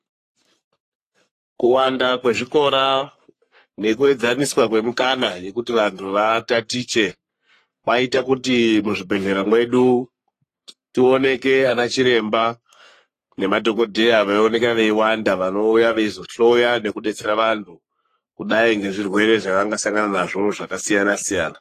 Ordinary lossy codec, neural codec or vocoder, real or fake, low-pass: AAC, 48 kbps; codec, 44.1 kHz, 3.4 kbps, Pupu-Codec; fake; 14.4 kHz